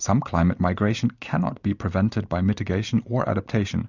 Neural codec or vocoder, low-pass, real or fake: none; 7.2 kHz; real